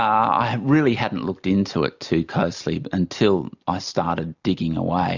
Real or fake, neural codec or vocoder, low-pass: real; none; 7.2 kHz